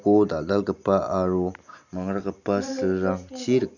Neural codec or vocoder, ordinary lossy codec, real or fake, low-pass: none; none; real; 7.2 kHz